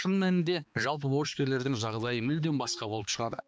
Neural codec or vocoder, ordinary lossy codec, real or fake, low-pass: codec, 16 kHz, 2 kbps, X-Codec, HuBERT features, trained on balanced general audio; none; fake; none